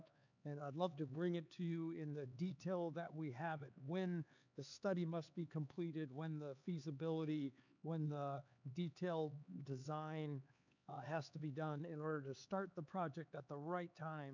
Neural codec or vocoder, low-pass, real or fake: codec, 16 kHz, 4 kbps, X-Codec, HuBERT features, trained on LibriSpeech; 7.2 kHz; fake